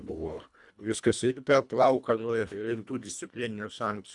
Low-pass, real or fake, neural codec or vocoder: 10.8 kHz; fake; codec, 24 kHz, 1.5 kbps, HILCodec